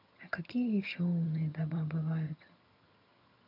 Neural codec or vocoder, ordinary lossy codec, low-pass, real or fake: vocoder, 22.05 kHz, 80 mel bands, HiFi-GAN; none; 5.4 kHz; fake